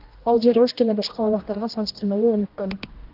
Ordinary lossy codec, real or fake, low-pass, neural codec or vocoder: Opus, 32 kbps; fake; 5.4 kHz; codec, 16 kHz, 1 kbps, X-Codec, HuBERT features, trained on general audio